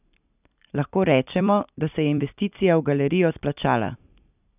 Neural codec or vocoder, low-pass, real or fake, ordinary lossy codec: vocoder, 44.1 kHz, 128 mel bands every 256 samples, BigVGAN v2; 3.6 kHz; fake; none